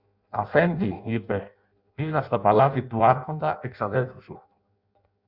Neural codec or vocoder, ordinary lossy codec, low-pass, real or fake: codec, 16 kHz in and 24 kHz out, 0.6 kbps, FireRedTTS-2 codec; Opus, 64 kbps; 5.4 kHz; fake